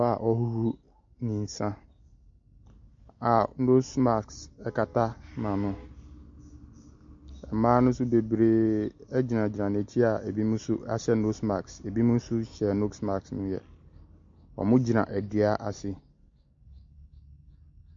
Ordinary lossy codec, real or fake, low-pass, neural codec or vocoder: MP3, 48 kbps; real; 7.2 kHz; none